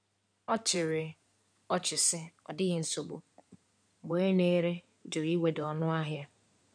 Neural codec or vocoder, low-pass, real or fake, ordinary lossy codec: codec, 16 kHz in and 24 kHz out, 2.2 kbps, FireRedTTS-2 codec; 9.9 kHz; fake; none